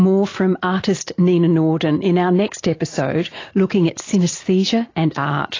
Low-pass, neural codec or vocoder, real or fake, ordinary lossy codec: 7.2 kHz; none; real; AAC, 32 kbps